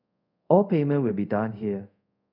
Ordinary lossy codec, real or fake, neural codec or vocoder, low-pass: none; fake; codec, 24 kHz, 0.5 kbps, DualCodec; 5.4 kHz